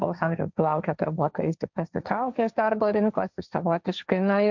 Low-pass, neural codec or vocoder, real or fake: 7.2 kHz; codec, 16 kHz, 1.1 kbps, Voila-Tokenizer; fake